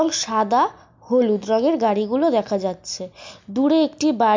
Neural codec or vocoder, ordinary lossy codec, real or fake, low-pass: none; MP3, 64 kbps; real; 7.2 kHz